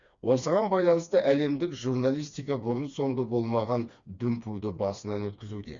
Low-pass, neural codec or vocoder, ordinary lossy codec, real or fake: 7.2 kHz; codec, 16 kHz, 2 kbps, FreqCodec, smaller model; Opus, 64 kbps; fake